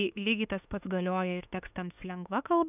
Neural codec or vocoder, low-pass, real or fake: autoencoder, 48 kHz, 32 numbers a frame, DAC-VAE, trained on Japanese speech; 3.6 kHz; fake